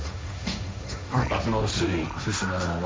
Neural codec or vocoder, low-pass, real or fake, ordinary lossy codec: codec, 16 kHz, 1.1 kbps, Voila-Tokenizer; none; fake; none